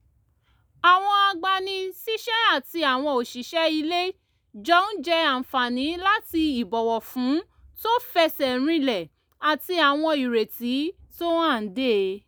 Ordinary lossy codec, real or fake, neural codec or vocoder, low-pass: none; real; none; none